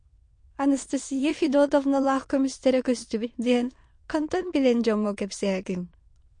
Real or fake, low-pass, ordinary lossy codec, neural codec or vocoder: fake; 9.9 kHz; MP3, 48 kbps; autoencoder, 22.05 kHz, a latent of 192 numbers a frame, VITS, trained on many speakers